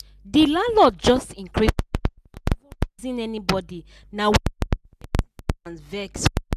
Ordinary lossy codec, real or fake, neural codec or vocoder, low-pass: none; real; none; 14.4 kHz